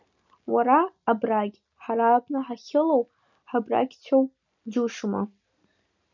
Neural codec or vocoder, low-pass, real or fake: none; 7.2 kHz; real